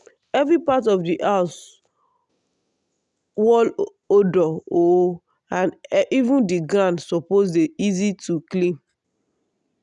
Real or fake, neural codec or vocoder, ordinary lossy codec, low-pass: real; none; none; 10.8 kHz